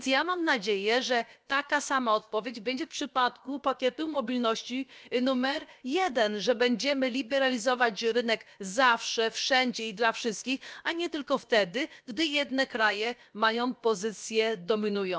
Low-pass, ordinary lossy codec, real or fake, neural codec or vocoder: none; none; fake; codec, 16 kHz, about 1 kbps, DyCAST, with the encoder's durations